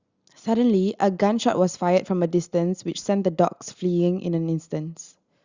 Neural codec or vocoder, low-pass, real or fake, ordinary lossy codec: none; 7.2 kHz; real; Opus, 64 kbps